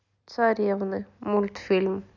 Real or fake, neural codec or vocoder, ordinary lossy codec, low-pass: real; none; none; 7.2 kHz